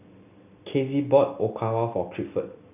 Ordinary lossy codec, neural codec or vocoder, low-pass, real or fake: none; none; 3.6 kHz; real